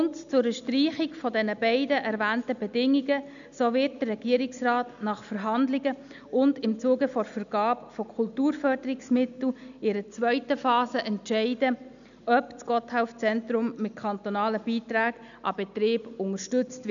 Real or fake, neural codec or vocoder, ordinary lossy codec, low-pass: real; none; none; 7.2 kHz